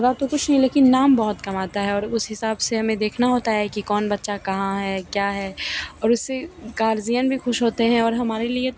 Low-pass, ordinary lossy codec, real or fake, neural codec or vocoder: none; none; real; none